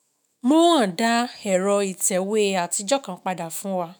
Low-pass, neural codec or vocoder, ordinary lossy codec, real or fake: none; autoencoder, 48 kHz, 128 numbers a frame, DAC-VAE, trained on Japanese speech; none; fake